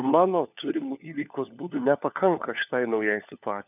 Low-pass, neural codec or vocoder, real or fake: 3.6 kHz; codec, 16 kHz, 4 kbps, FunCodec, trained on LibriTTS, 50 frames a second; fake